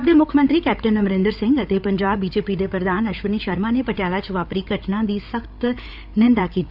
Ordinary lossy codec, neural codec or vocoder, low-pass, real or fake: none; codec, 16 kHz, 8 kbps, FreqCodec, larger model; 5.4 kHz; fake